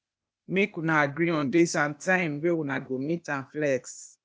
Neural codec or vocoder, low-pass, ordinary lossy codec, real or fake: codec, 16 kHz, 0.8 kbps, ZipCodec; none; none; fake